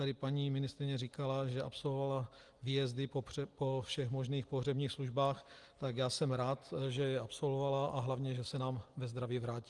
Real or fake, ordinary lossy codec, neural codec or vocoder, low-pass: real; Opus, 24 kbps; none; 10.8 kHz